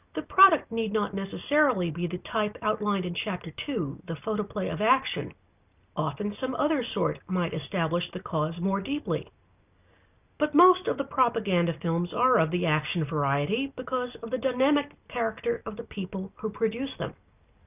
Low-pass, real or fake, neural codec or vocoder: 3.6 kHz; real; none